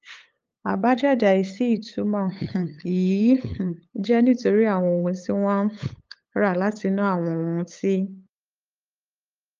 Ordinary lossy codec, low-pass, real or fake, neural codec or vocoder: Opus, 24 kbps; 7.2 kHz; fake; codec, 16 kHz, 8 kbps, FunCodec, trained on LibriTTS, 25 frames a second